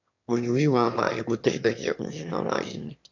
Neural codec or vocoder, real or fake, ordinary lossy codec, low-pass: autoencoder, 22.05 kHz, a latent of 192 numbers a frame, VITS, trained on one speaker; fake; none; 7.2 kHz